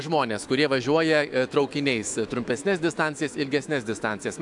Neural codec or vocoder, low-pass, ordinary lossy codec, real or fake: autoencoder, 48 kHz, 128 numbers a frame, DAC-VAE, trained on Japanese speech; 10.8 kHz; AAC, 64 kbps; fake